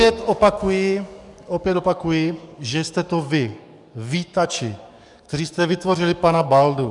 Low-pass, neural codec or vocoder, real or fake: 10.8 kHz; codec, 44.1 kHz, 7.8 kbps, DAC; fake